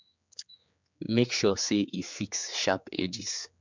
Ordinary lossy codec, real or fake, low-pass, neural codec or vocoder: MP3, 64 kbps; fake; 7.2 kHz; codec, 16 kHz, 4 kbps, X-Codec, HuBERT features, trained on general audio